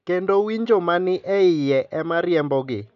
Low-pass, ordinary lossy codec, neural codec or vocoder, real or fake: 7.2 kHz; none; none; real